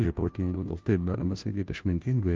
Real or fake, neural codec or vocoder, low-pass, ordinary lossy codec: fake; codec, 16 kHz, 0.5 kbps, FunCodec, trained on Chinese and English, 25 frames a second; 7.2 kHz; Opus, 32 kbps